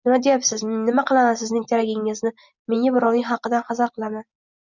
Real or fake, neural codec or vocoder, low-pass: real; none; 7.2 kHz